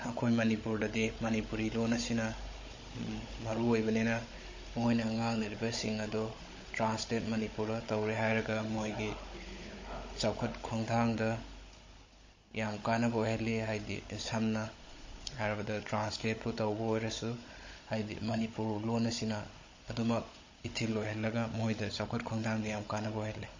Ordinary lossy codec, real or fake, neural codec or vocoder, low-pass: MP3, 32 kbps; fake; codec, 16 kHz, 16 kbps, FunCodec, trained on Chinese and English, 50 frames a second; 7.2 kHz